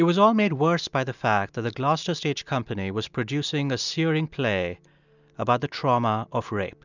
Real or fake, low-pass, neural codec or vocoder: real; 7.2 kHz; none